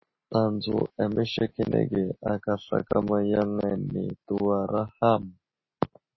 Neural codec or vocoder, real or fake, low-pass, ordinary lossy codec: none; real; 7.2 kHz; MP3, 24 kbps